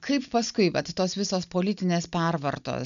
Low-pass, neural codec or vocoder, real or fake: 7.2 kHz; none; real